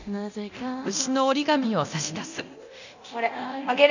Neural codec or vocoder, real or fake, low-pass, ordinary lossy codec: codec, 24 kHz, 0.9 kbps, DualCodec; fake; 7.2 kHz; none